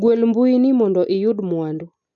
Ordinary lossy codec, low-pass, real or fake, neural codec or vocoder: none; 7.2 kHz; real; none